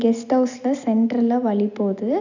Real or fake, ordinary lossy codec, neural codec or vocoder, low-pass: real; none; none; 7.2 kHz